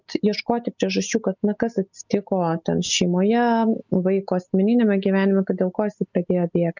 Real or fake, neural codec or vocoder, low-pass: real; none; 7.2 kHz